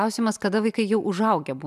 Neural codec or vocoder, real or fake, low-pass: none; real; 14.4 kHz